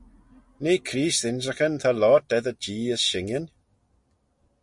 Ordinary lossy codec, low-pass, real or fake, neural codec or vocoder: MP3, 48 kbps; 10.8 kHz; real; none